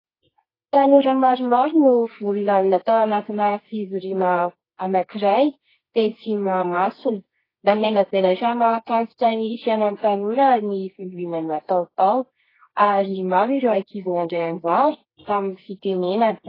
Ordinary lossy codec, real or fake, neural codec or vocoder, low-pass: AAC, 24 kbps; fake; codec, 24 kHz, 0.9 kbps, WavTokenizer, medium music audio release; 5.4 kHz